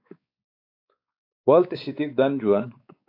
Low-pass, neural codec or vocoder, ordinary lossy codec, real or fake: 5.4 kHz; codec, 16 kHz, 4 kbps, X-Codec, WavLM features, trained on Multilingual LibriSpeech; AAC, 32 kbps; fake